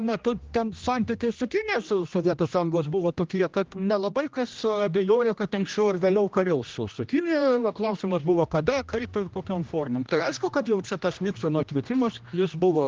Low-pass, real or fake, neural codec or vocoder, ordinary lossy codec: 7.2 kHz; fake; codec, 16 kHz, 1 kbps, X-Codec, HuBERT features, trained on general audio; Opus, 32 kbps